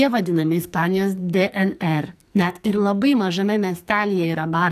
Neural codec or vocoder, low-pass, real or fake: codec, 44.1 kHz, 2.6 kbps, SNAC; 14.4 kHz; fake